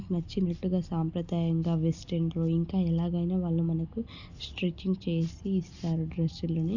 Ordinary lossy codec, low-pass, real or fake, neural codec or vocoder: none; 7.2 kHz; real; none